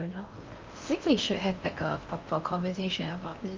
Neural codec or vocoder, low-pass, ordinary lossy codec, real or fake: codec, 16 kHz in and 24 kHz out, 0.8 kbps, FocalCodec, streaming, 65536 codes; 7.2 kHz; Opus, 24 kbps; fake